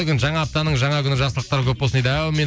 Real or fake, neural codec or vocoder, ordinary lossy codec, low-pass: real; none; none; none